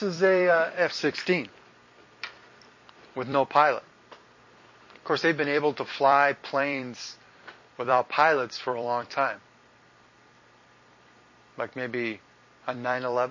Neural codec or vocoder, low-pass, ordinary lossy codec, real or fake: vocoder, 44.1 kHz, 128 mel bands every 256 samples, BigVGAN v2; 7.2 kHz; MP3, 32 kbps; fake